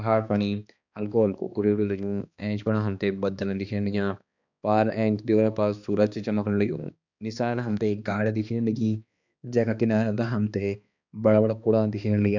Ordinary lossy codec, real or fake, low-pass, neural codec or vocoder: none; fake; 7.2 kHz; codec, 16 kHz, 2 kbps, X-Codec, HuBERT features, trained on balanced general audio